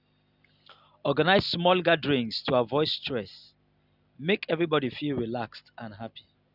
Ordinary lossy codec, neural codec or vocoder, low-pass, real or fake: none; none; 5.4 kHz; real